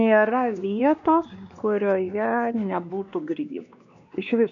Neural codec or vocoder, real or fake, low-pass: codec, 16 kHz, 2 kbps, X-Codec, WavLM features, trained on Multilingual LibriSpeech; fake; 7.2 kHz